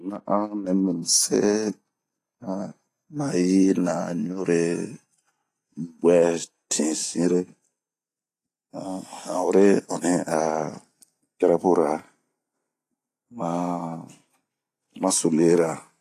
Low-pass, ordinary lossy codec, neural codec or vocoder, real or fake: 14.4 kHz; AAC, 48 kbps; none; real